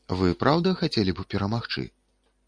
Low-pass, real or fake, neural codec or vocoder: 9.9 kHz; real; none